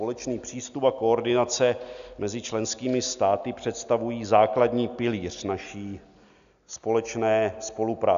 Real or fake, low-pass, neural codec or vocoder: real; 7.2 kHz; none